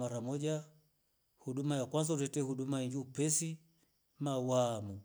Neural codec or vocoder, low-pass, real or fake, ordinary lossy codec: none; none; real; none